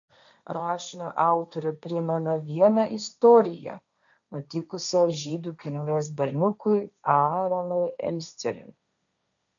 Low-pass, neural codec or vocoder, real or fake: 7.2 kHz; codec, 16 kHz, 1.1 kbps, Voila-Tokenizer; fake